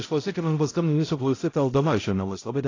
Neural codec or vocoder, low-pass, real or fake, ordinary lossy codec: codec, 16 kHz, 0.5 kbps, X-Codec, HuBERT features, trained on balanced general audio; 7.2 kHz; fake; AAC, 32 kbps